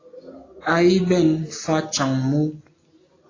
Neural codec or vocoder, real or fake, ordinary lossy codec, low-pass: none; real; AAC, 32 kbps; 7.2 kHz